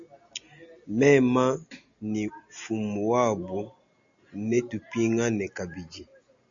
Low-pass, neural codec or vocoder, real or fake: 7.2 kHz; none; real